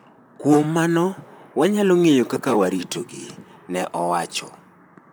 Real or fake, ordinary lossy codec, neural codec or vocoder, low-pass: fake; none; vocoder, 44.1 kHz, 128 mel bands, Pupu-Vocoder; none